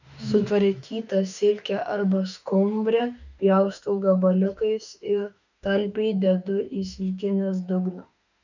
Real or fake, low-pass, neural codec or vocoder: fake; 7.2 kHz; autoencoder, 48 kHz, 32 numbers a frame, DAC-VAE, trained on Japanese speech